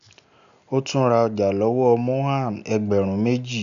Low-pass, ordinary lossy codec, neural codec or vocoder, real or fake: 7.2 kHz; none; none; real